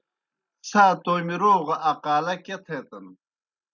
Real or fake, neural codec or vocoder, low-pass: real; none; 7.2 kHz